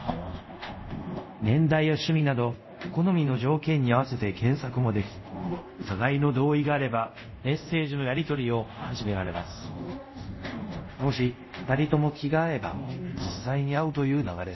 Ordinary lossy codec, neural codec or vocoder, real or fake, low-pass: MP3, 24 kbps; codec, 24 kHz, 0.5 kbps, DualCodec; fake; 7.2 kHz